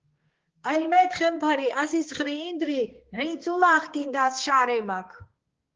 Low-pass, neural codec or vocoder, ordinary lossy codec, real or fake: 7.2 kHz; codec, 16 kHz, 2 kbps, X-Codec, HuBERT features, trained on balanced general audio; Opus, 16 kbps; fake